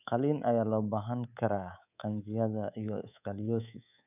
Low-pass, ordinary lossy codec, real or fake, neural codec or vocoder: 3.6 kHz; none; fake; codec, 24 kHz, 3.1 kbps, DualCodec